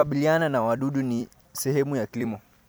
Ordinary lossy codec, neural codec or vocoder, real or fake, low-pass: none; vocoder, 44.1 kHz, 128 mel bands every 256 samples, BigVGAN v2; fake; none